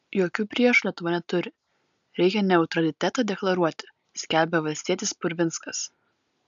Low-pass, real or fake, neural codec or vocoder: 7.2 kHz; real; none